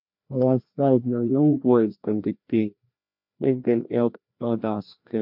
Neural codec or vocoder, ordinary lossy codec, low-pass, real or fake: codec, 16 kHz, 1 kbps, FreqCodec, larger model; MP3, 48 kbps; 5.4 kHz; fake